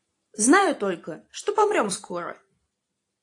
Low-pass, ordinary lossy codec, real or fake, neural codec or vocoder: 10.8 kHz; AAC, 48 kbps; fake; vocoder, 44.1 kHz, 128 mel bands every 256 samples, BigVGAN v2